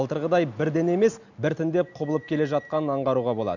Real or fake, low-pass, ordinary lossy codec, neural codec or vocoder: real; 7.2 kHz; none; none